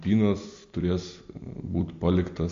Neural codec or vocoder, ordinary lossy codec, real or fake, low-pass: none; AAC, 48 kbps; real; 7.2 kHz